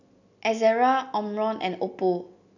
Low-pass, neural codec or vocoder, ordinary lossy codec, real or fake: 7.2 kHz; none; none; real